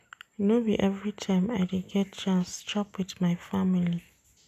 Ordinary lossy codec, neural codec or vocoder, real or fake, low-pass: none; none; real; 14.4 kHz